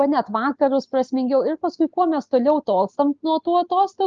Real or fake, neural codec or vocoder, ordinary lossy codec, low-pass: real; none; Opus, 24 kbps; 7.2 kHz